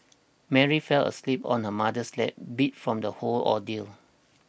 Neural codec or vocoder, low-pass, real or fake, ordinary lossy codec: none; none; real; none